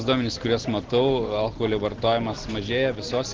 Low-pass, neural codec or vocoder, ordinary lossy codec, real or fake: 7.2 kHz; none; Opus, 16 kbps; real